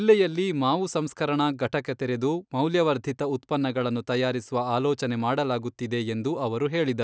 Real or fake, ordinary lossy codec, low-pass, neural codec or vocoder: real; none; none; none